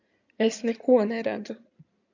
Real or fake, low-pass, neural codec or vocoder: fake; 7.2 kHz; codec, 16 kHz in and 24 kHz out, 2.2 kbps, FireRedTTS-2 codec